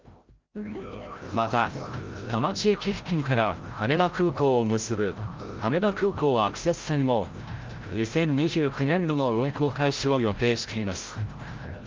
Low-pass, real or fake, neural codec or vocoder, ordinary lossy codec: 7.2 kHz; fake; codec, 16 kHz, 0.5 kbps, FreqCodec, larger model; Opus, 32 kbps